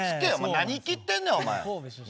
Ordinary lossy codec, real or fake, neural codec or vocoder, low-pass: none; real; none; none